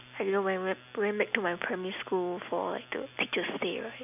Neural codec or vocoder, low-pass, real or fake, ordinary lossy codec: none; 3.6 kHz; real; none